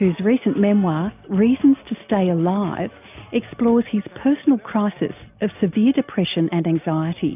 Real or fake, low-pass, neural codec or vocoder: real; 3.6 kHz; none